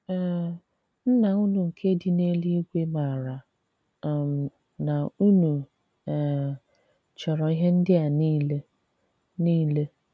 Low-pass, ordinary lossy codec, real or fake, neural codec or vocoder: none; none; real; none